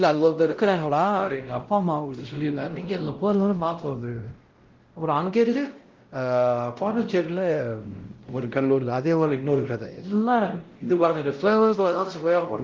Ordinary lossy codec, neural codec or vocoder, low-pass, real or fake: Opus, 16 kbps; codec, 16 kHz, 0.5 kbps, X-Codec, WavLM features, trained on Multilingual LibriSpeech; 7.2 kHz; fake